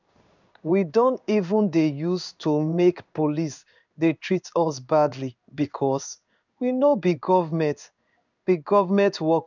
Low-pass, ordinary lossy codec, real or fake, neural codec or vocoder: 7.2 kHz; none; fake; codec, 16 kHz in and 24 kHz out, 1 kbps, XY-Tokenizer